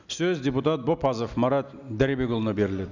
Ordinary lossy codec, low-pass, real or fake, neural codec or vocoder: none; 7.2 kHz; real; none